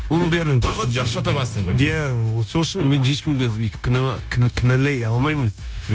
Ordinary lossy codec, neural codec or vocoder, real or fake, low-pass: none; codec, 16 kHz, 0.9 kbps, LongCat-Audio-Codec; fake; none